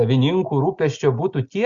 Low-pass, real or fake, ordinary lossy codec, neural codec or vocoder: 7.2 kHz; real; Opus, 64 kbps; none